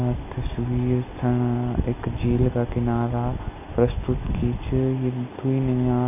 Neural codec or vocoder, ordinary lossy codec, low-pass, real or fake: none; none; 3.6 kHz; real